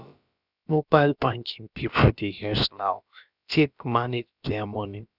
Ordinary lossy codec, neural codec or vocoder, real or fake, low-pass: none; codec, 16 kHz, about 1 kbps, DyCAST, with the encoder's durations; fake; 5.4 kHz